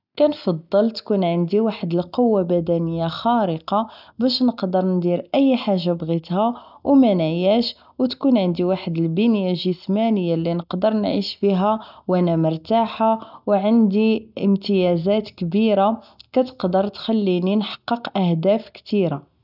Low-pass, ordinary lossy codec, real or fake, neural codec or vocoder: 5.4 kHz; none; real; none